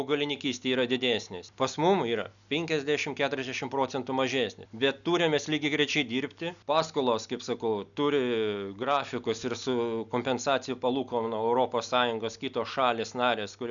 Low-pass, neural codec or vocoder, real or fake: 7.2 kHz; none; real